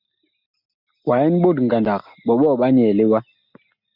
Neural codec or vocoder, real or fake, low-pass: none; real; 5.4 kHz